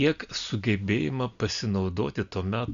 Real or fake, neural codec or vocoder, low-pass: real; none; 7.2 kHz